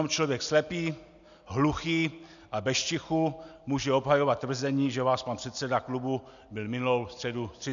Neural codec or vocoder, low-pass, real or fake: none; 7.2 kHz; real